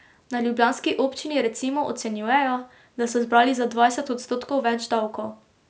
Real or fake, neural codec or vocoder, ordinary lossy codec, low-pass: real; none; none; none